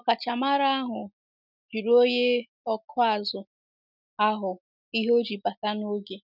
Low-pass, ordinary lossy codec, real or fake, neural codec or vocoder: 5.4 kHz; none; real; none